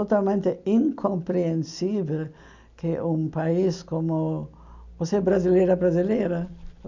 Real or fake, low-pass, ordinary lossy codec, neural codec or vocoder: real; 7.2 kHz; none; none